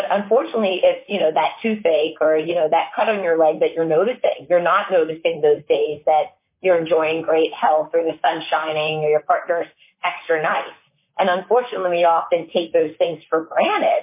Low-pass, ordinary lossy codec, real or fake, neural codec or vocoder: 3.6 kHz; MP3, 24 kbps; fake; vocoder, 44.1 kHz, 128 mel bands, Pupu-Vocoder